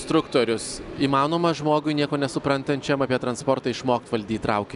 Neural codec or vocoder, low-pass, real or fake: none; 10.8 kHz; real